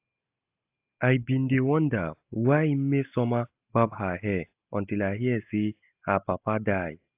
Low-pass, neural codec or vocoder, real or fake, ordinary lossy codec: 3.6 kHz; none; real; AAC, 32 kbps